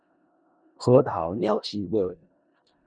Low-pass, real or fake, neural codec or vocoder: 9.9 kHz; fake; codec, 16 kHz in and 24 kHz out, 0.4 kbps, LongCat-Audio-Codec, four codebook decoder